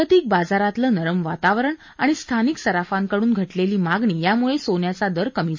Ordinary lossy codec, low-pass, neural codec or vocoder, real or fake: MP3, 32 kbps; 7.2 kHz; none; real